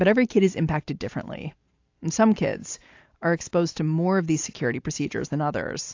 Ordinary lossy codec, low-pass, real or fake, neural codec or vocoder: AAC, 48 kbps; 7.2 kHz; real; none